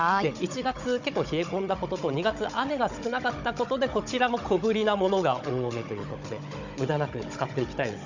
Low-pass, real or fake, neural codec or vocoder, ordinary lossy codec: 7.2 kHz; fake; codec, 16 kHz, 16 kbps, FunCodec, trained on Chinese and English, 50 frames a second; none